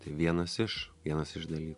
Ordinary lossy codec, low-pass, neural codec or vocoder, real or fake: MP3, 64 kbps; 10.8 kHz; none; real